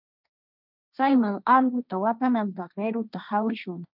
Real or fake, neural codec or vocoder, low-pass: fake; codec, 16 kHz, 1.1 kbps, Voila-Tokenizer; 5.4 kHz